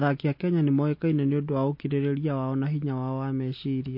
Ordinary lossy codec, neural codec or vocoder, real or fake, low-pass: MP3, 32 kbps; none; real; 5.4 kHz